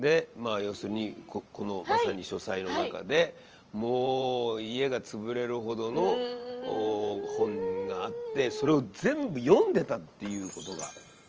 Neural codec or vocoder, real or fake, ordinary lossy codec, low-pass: vocoder, 44.1 kHz, 128 mel bands every 512 samples, BigVGAN v2; fake; Opus, 24 kbps; 7.2 kHz